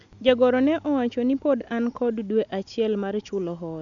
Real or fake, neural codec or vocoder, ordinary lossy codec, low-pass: real; none; none; 7.2 kHz